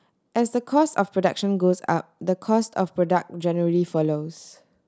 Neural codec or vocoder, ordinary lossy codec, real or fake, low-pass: none; none; real; none